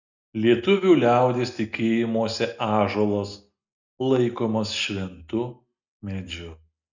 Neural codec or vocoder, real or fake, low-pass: none; real; 7.2 kHz